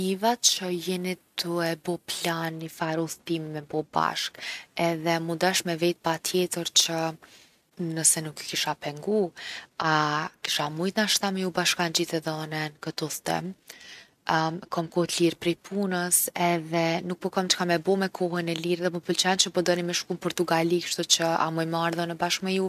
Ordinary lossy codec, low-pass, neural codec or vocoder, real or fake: none; 14.4 kHz; none; real